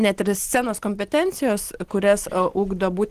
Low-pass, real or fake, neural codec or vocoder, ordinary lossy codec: 14.4 kHz; real; none; Opus, 16 kbps